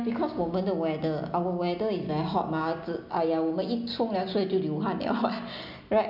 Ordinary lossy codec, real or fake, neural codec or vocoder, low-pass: none; real; none; 5.4 kHz